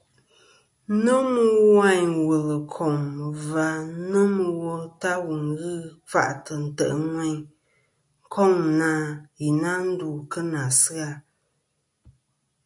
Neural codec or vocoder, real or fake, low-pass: none; real; 10.8 kHz